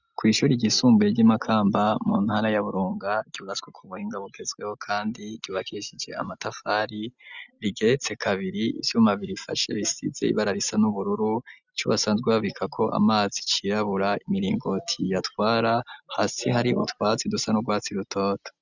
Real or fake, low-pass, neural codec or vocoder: real; 7.2 kHz; none